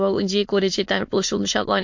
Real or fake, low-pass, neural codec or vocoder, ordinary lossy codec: fake; 7.2 kHz; autoencoder, 22.05 kHz, a latent of 192 numbers a frame, VITS, trained on many speakers; MP3, 48 kbps